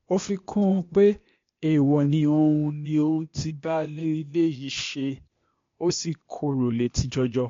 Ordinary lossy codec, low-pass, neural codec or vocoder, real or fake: MP3, 48 kbps; 7.2 kHz; codec, 16 kHz, 0.8 kbps, ZipCodec; fake